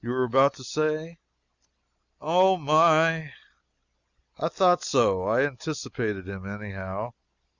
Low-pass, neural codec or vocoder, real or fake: 7.2 kHz; none; real